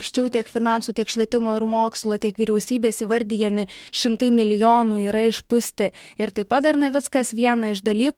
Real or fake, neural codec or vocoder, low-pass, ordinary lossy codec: fake; codec, 44.1 kHz, 2.6 kbps, DAC; 19.8 kHz; MP3, 96 kbps